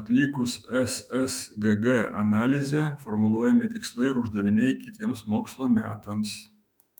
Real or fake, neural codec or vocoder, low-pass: fake; autoencoder, 48 kHz, 32 numbers a frame, DAC-VAE, trained on Japanese speech; 19.8 kHz